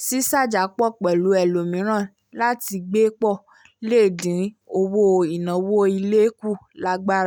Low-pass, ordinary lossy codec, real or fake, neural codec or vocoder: 19.8 kHz; none; real; none